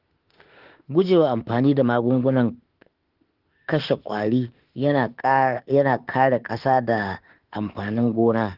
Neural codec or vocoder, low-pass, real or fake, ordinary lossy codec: autoencoder, 48 kHz, 32 numbers a frame, DAC-VAE, trained on Japanese speech; 5.4 kHz; fake; Opus, 16 kbps